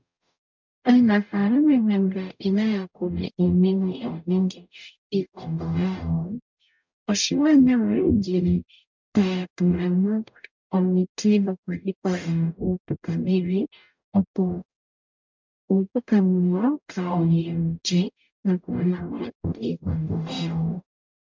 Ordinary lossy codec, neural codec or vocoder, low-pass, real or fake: MP3, 64 kbps; codec, 44.1 kHz, 0.9 kbps, DAC; 7.2 kHz; fake